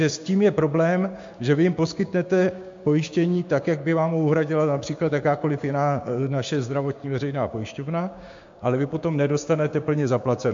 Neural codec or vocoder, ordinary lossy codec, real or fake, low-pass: codec, 16 kHz, 6 kbps, DAC; MP3, 48 kbps; fake; 7.2 kHz